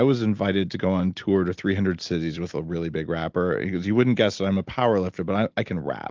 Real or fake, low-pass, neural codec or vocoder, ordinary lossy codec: real; 7.2 kHz; none; Opus, 32 kbps